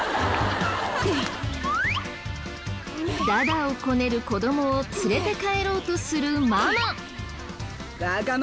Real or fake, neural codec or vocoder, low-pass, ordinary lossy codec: real; none; none; none